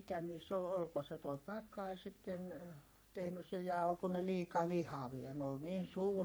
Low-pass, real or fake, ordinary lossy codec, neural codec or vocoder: none; fake; none; codec, 44.1 kHz, 3.4 kbps, Pupu-Codec